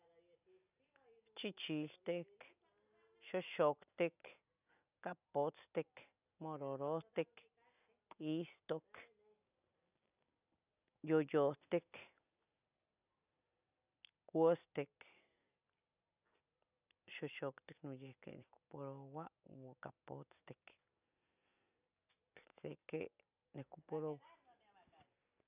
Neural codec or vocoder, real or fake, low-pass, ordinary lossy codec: none; real; 3.6 kHz; none